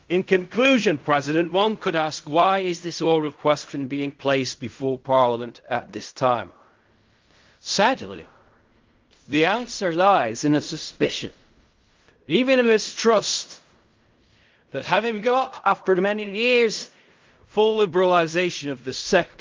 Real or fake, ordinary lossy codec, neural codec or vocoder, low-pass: fake; Opus, 24 kbps; codec, 16 kHz in and 24 kHz out, 0.4 kbps, LongCat-Audio-Codec, fine tuned four codebook decoder; 7.2 kHz